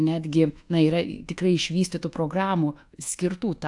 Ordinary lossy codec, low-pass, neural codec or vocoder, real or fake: AAC, 64 kbps; 10.8 kHz; codec, 24 kHz, 1.2 kbps, DualCodec; fake